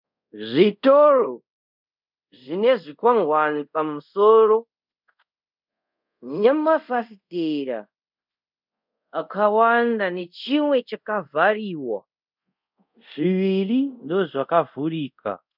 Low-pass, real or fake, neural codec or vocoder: 5.4 kHz; fake; codec, 24 kHz, 0.5 kbps, DualCodec